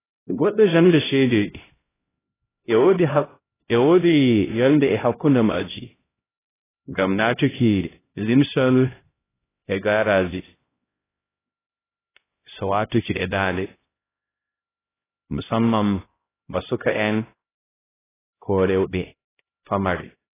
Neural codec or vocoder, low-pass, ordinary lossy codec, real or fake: codec, 16 kHz, 0.5 kbps, X-Codec, HuBERT features, trained on LibriSpeech; 3.6 kHz; AAC, 16 kbps; fake